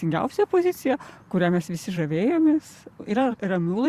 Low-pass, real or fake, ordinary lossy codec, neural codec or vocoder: 14.4 kHz; fake; Opus, 64 kbps; vocoder, 44.1 kHz, 128 mel bands every 512 samples, BigVGAN v2